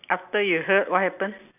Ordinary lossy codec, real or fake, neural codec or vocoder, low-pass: none; real; none; 3.6 kHz